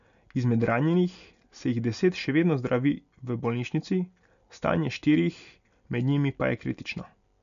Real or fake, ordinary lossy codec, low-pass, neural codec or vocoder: real; none; 7.2 kHz; none